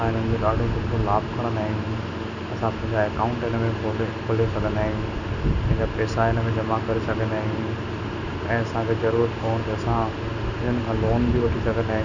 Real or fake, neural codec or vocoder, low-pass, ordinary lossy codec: real; none; 7.2 kHz; none